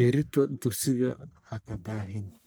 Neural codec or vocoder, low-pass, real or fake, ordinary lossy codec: codec, 44.1 kHz, 1.7 kbps, Pupu-Codec; none; fake; none